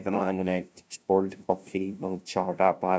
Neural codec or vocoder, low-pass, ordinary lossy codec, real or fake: codec, 16 kHz, 0.5 kbps, FunCodec, trained on LibriTTS, 25 frames a second; none; none; fake